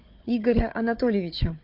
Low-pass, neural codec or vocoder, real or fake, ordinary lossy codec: 5.4 kHz; codec, 16 kHz, 4 kbps, FunCodec, trained on Chinese and English, 50 frames a second; fake; MP3, 48 kbps